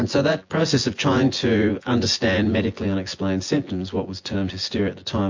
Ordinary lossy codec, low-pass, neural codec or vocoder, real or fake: MP3, 48 kbps; 7.2 kHz; vocoder, 24 kHz, 100 mel bands, Vocos; fake